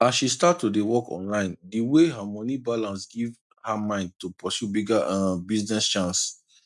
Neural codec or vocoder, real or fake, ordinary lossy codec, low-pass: none; real; none; none